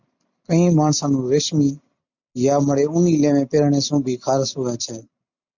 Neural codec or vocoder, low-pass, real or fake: none; 7.2 kHz; real